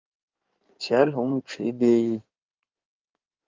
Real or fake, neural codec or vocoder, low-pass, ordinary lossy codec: fake; codec, 16 kHz in and 24 kHz out, 2.2 kbps, FireRedTTS-2 codec; 7.2 kHz; Opus, 32 kbps